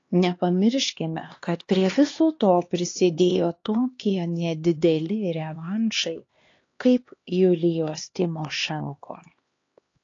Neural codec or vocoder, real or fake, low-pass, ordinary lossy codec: codec, 16 kHz, 2 kbps, X-Codec, HuBERT features, trained on LibriSpeech; fake; 7.2 kHz; AAC, 32 kbps